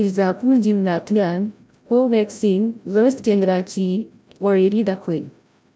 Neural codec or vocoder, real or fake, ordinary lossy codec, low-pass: codec, 16 kHz, 0.5 kbps, FreqCodec, larger model; fake; none; none